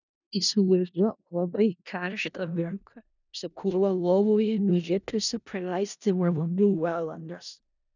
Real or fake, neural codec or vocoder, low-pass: fake; codec, 16 kHz in and 24 kHz out, 0.4 kbps, LongCat-Audio-Codec, four codebook decoder; 7.2 kHz